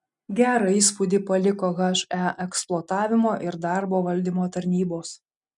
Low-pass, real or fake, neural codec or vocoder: 10.8 kHz; real; none